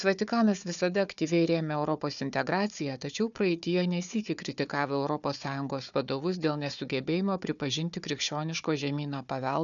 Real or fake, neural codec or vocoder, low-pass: fake; codec, 16 kHz, 4 kbps, FunCodec, trained on Chinese and English, 50 frames a second; 7.2 kHz